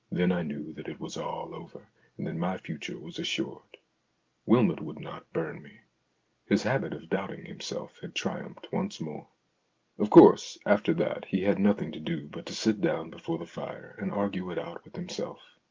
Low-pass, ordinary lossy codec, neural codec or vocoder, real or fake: 7.2 kHz; Opus, 32 kbps; none; real